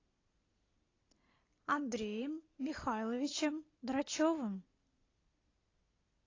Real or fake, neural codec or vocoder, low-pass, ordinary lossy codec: real; none; 7.2 kHz; AAC, 32 kbps